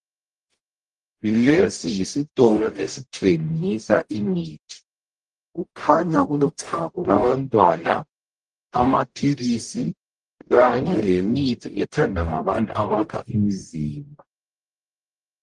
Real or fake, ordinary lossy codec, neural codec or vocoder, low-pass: fake; Opus, 24 kbps; codec, 44.1 kHz, 0.9 kbps, DAC; 10.8 kHz